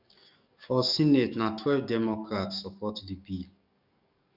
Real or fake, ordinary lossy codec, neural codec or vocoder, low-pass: fake; none; codec, 44.1 kHz, 7.8 kbps, DAC; 5.4 kHz